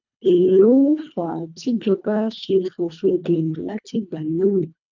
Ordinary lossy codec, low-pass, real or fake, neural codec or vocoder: none; 7.2 kHz; fake; codec, 24 kHz, 1.5 kbps, HILCodec